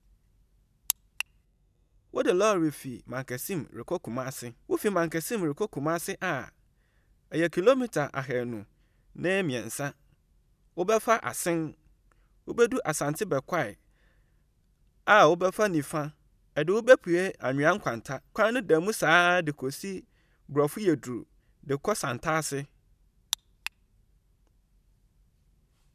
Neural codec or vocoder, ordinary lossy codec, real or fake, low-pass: none; none; real; 14.4 kHz